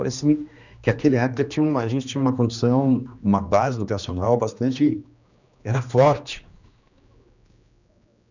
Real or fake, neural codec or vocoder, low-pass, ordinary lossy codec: fake; codec, 16 kHz, 2 kbps, X-Codec, HuBERT features, trained on general audio; 7.2 kHz; none